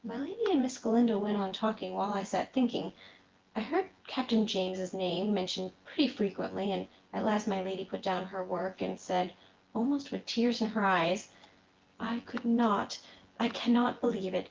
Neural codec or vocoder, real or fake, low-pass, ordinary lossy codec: vocoder, 24 kHz, 100 mel bands, Vocos; fake; 7.2 kHz; Opus, 16 kbps